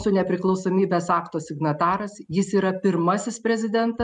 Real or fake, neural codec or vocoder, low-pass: real; none; 10.8 kHz